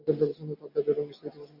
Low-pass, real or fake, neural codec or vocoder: 5.4 kHz; real; none